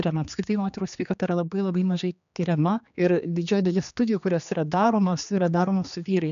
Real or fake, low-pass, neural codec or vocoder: fake; 7.2 kHz; codec, 16 kHz, 2 kbps, X-Codec, HuBERT features, trained on general audio